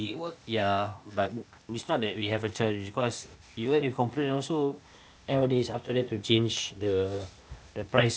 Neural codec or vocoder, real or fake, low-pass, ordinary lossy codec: codec, 16 kHz, 0.8 kbps, ZipCodec; fake; none; none